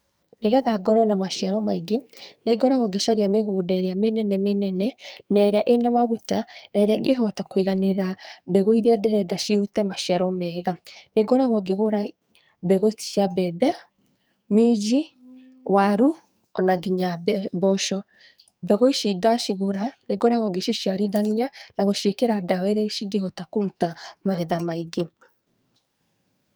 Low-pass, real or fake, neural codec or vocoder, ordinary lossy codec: none; fake; codec, 44.1 kHz, 2.6 kbps, SNAC; none